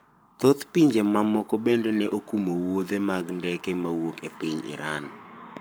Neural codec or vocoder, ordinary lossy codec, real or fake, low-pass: codec, 44.1 kHz, 7.8 kbps, Pupu-Codec; none; fake; none